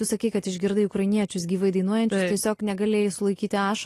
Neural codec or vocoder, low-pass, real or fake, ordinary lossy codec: none; 14.4 kHz; real; AAC, 48 kbps